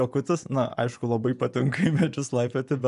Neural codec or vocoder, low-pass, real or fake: vocoder, 24 kHz, 100 mel bands, Vocos; 10.8 kHz; fake